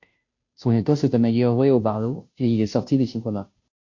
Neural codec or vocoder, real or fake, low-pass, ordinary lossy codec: codec, 16 kHz, 0.5 kbps, FunCodec, trained on Chinese and English, 25 frames a second; fake; 7.2 kHz; MP3, 48 kbps